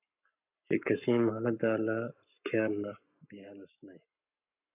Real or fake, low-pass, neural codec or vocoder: fake; 3.6 kHz; codec, 44.1 kHz, 7.8 kbps, Pupu-Codec